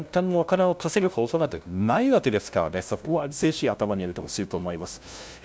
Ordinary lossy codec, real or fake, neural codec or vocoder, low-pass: none; fake; codec, 16 kHz, 0.5 kbps, FunCodec, trained on LibriTTS, 25 frames a second; none